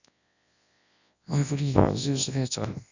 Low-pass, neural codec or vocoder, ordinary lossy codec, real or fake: 7.2 kHz; codec, 24 kHz, 0.9 kbps, WavTokenizer, large speech release; none; fake